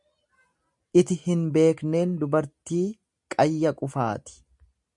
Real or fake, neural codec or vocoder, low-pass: real; none; 10.8 kHz